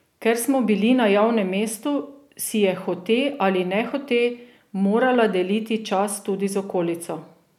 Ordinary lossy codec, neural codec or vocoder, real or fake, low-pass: none; none; real; 19.8 kHz